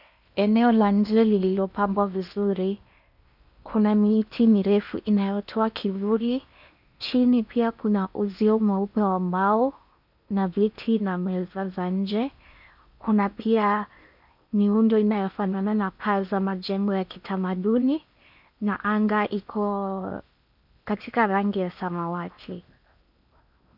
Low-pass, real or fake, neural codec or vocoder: 5.4 kHz; fake; codec, 16 kHz in and 24 kHz out, 0.8 kbps, FocalCodec, streaming, 65536 codes